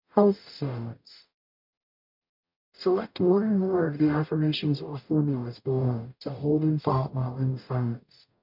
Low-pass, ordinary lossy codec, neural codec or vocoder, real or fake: 5.4 kHz; MP3, 48 kbps; codec, 44.1 kHz, 0.9 kbps, DAC; fake